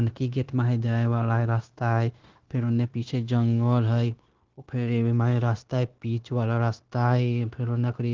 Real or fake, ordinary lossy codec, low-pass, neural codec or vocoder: fake; Opus, 16 kbps; 7.2 kHz; codec, 16 kHz, 0.9 kbps, LongCat-Audio-Codec